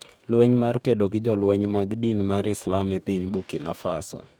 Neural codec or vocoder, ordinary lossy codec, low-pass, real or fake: codec, 44.1 kHz, 2.6 kbps, DAC; none; none; fake